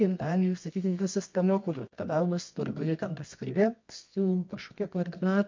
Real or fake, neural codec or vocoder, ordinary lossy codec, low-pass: fake; codec, 24 kHz, 0.9 kbps, WavTokenizer, medium music audio release; MP3, 48 kbps; 7.2 kHz